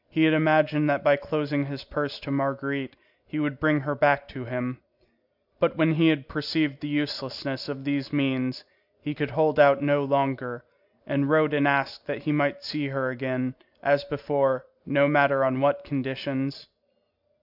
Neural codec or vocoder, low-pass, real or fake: none; 5.4 kHz; real